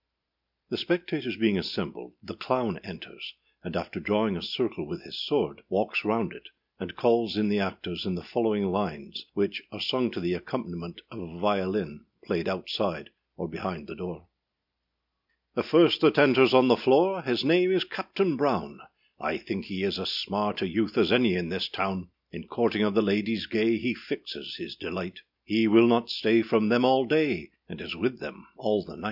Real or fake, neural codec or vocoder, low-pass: real; none; 5.4 kHz